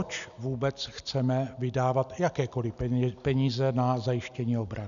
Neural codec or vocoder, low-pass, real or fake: none; 7.2 kHz; real